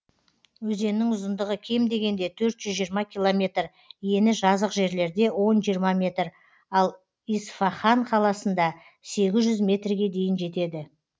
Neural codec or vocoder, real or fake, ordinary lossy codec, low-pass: none; real; none; none